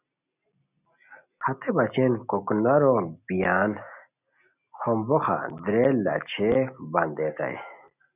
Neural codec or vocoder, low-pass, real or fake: none; 3.6 kHz; real